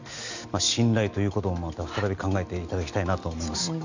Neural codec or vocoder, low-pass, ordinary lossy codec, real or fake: none; 7.2 kHz; none; real